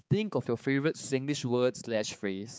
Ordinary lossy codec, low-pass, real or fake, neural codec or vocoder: none; none; fake; codec, 16 kHz, 4 kbps, X-Codec, HuBERT features, trained on balanced general audio